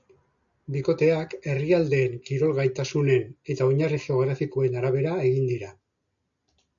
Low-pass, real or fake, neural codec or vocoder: 7.2 kHz; real; none